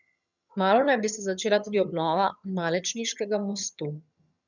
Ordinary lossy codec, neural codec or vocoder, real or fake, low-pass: none; vocoder, 22.05 kHz, 80 mel bands, HiFi-GAN; fake; 7.2 kHz